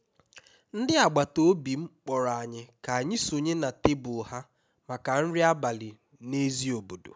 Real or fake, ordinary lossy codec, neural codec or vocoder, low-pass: real; none; none; none